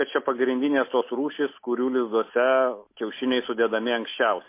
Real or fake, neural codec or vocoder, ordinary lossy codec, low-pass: real; none; MP3, 24 kbps; 3.6 kHz